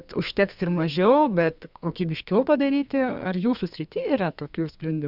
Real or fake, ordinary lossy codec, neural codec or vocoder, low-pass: fake; Opus, 64 kbps; codec, 32 kHz, 1.9 kbps, SNAC; 5.4 kHz